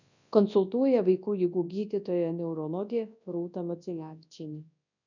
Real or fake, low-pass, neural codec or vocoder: fake; 7.2 kHz; codec, 24 kHz, 0.9 kbps, WavTokenizer, large speech release